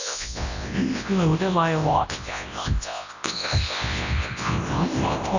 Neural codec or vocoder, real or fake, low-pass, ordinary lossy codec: codec, 24 kHz, 0.9 kbps, WavTokenizer, large speech release; fake; 7.2 kHz; none